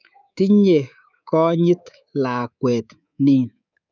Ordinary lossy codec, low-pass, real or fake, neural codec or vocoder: none; 7.2 kHz; fake; codec, 24 kHz, 3.1 kbps, DualCodec